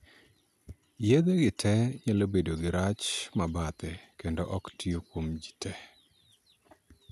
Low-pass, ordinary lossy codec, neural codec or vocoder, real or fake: 14.4 kHz; none; none; real